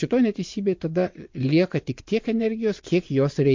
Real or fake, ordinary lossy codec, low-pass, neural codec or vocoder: fake; MP3, 48 kbps; 7.2 kHz; vocoder, 22.05 kHz, 80 mel bands, WaveNeXt